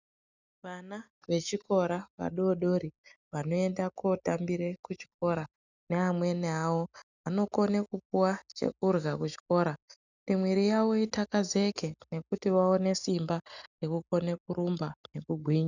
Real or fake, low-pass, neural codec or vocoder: real; 7.2 kHz; none